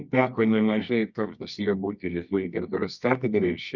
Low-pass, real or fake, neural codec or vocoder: 7.2 kHz; fake; codec, 24 kHz, 0.9 kbps, WavTokenizer, medium music audio release